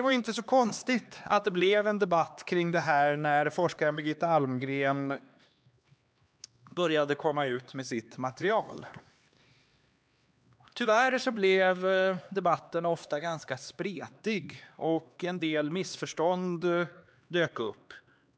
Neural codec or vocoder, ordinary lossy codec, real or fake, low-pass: codec, 16 kHz, 2 kbps, X-Codec, HuBERT features, trained on LibriSpeech; none; fake; none